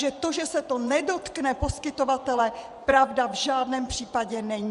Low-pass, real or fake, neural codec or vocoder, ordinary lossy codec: 10.8 kHz; fake; vocoder, 24 kHz, 100 mel bands, Vocos; Opus, 64 kbps